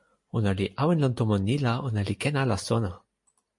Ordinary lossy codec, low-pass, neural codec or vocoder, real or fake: MP3, 48 kbps; 10.8 kHz; none; real